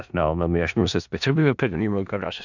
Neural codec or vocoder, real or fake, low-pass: codec, 16 kHz in and 24 kHz out, 0.4 kbps, LongCat-Audio-Codec, four codebook decoder; fake; 7.2 kHz